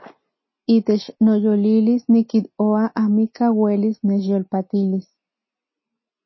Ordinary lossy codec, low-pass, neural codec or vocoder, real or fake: MP3, 24 kbps; 7.2 kHz; none; real